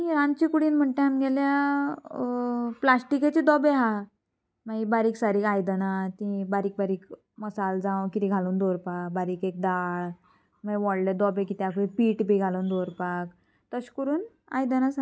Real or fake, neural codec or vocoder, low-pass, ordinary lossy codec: real; none; none; none